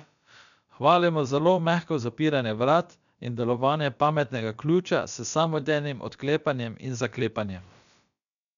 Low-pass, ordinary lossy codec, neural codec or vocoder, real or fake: 7.2 kHz; none; codec, 16 kHz, about 1 kbps, DyCAST, with the encoder's durations; fake